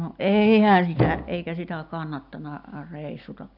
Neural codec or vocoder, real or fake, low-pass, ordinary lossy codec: none; real; 5.4 kHz; none